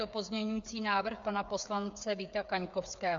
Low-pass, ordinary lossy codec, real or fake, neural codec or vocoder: 7.2 kHz; AAC, 64 kbps; fake; codec, 16 kHz, 8 kbps, FreqCodec, smaller model